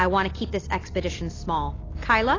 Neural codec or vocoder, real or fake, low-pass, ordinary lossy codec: none; real; 7.2 kHz; AAC, 32 kbps